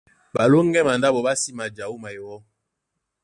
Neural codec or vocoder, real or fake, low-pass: none; real; 10.8 kHz